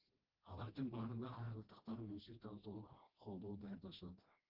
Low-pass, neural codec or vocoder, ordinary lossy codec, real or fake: 5.4 kHz; codec, 16 kHz, 1 kbps, FreqCodec, smaller model; Opus, 16 kbps; fake